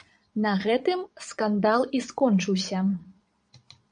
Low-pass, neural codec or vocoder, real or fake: 9.9 kHz; vocoder, 22.05 kHz, 80 mel bands, Vocos; fake